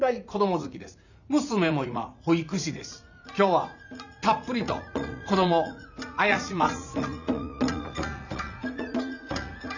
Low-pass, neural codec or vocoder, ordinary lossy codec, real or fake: 7.2 kHz; vocoder, 44.1 kHz, 80 mel bands, Vocos; none; fake